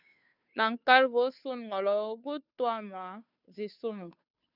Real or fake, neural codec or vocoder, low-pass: fake; codec, 16 kHz, 2 kbps, FunCodec, trained on Chinese and English, 25 frames a second; 5.4 kHz